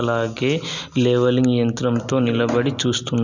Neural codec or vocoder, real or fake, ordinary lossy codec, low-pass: none; real; none; 7.2 kHz